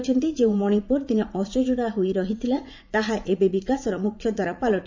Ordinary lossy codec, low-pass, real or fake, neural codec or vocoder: MP3, 64 kbps; 7.2 kHz; fake; vocoder, 44.1 kHz, 80 mel bands, Vocos